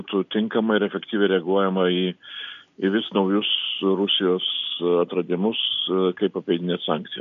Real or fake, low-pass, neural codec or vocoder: real; 7.2 kHz; none